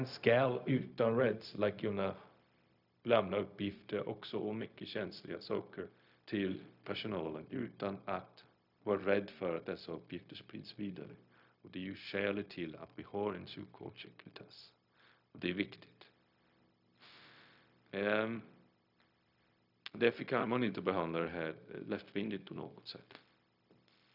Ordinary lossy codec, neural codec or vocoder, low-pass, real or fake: none; codec, 16 kHz, 0.4 kbps, LongCat-Audio-Codec; 5.4 kHz; fake